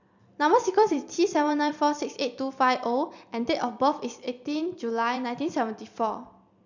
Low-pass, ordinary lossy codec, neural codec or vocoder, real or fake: 7.2 kHz; none; vocoder, 44.1 kHz, 128 mel bands every 256 samples, BigVGAN v2; fake